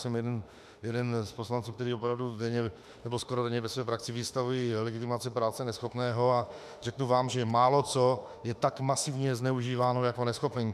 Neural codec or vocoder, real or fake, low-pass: autoencoder, 48 kHz, 32 numbers a frame, DAC-VAE, trained on Japanese speech; fake; 14.4 kHz